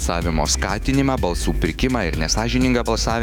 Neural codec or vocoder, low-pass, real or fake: autoencoder, 48 kHz, 128 numbers a frame, DAC-VAE, trained on Japanese speech; 19.8 kHz; fake